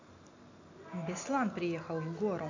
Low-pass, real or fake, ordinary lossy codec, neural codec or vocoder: 7.2 kHz; real; AAC, 48 kbps; none